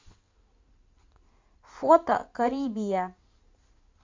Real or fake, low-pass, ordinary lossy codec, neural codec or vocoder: fake; 7.2 kHz; MP3, 48 kbps; codec, 16 kHz in and 24 kHz out, 2.2 kbps, FireRedTTS-2 codec